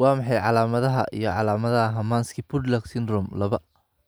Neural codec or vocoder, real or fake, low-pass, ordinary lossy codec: none; real; none; none